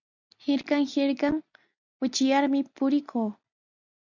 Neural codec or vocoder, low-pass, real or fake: none; 7.2 kHz; real